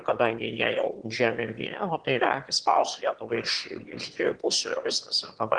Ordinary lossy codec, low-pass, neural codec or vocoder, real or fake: Opus, 16 kbps; 9.9 kHz; autoencoder, 22.05 kHz, a latent of 192 numbers a frame, VITS, trained on one speaker; fake